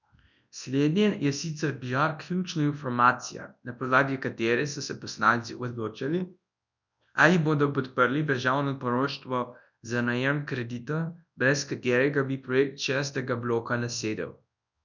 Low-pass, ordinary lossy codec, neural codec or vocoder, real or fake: 7.2 kHz; none; codec, 24 kHz, 0.9 kbps, WavTokenizer, large speech release; fake